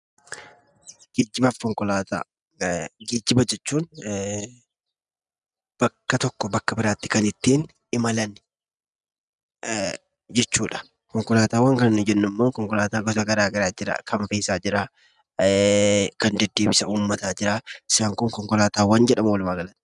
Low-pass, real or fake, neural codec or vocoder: 10.8 kHz; real; none